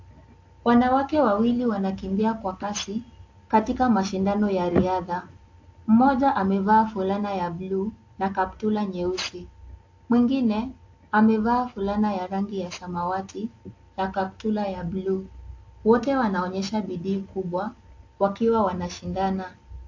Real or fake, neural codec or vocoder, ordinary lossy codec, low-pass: real; none; AAC, 48 kbps; 7.2 kHz